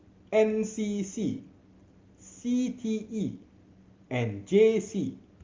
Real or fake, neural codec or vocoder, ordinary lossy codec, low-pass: real; none; Opus, 32 kbps; 7.2 kHz